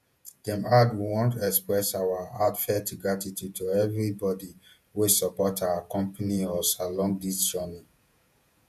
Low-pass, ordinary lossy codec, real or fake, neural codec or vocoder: 14.4 kHz; none; fake; vocoder, 48 kHz, 128 mel bands, Vocos